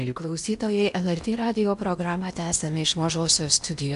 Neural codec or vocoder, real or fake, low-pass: codec, 16 kHz in and 24 kHz out, 0.8 kbps, FocalCodec, streaming, 65536 codes; fake; 10.8 kHz